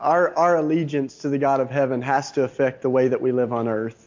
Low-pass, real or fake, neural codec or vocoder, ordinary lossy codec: 7.2 kHz; real; none; MP3, 48 kbps